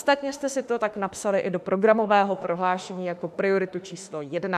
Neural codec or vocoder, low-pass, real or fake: autoencoder, 48 kHz, 32 numbers a frame, DAC-VAE, trained on Japanese speech; 14.4 kHz; fake